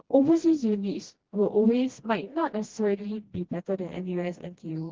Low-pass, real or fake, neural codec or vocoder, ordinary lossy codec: 7.2 kHz; fake; codec, 16 kHz, 1 kbps, FreqCodec, smaller model; Opus, 16 kbps